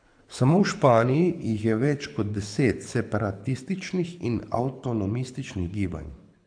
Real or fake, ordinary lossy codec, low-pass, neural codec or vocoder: fake; AAC, 64 kbps; 9.9 kHz; codec, 24 kHz, 6 kbps, HILCodec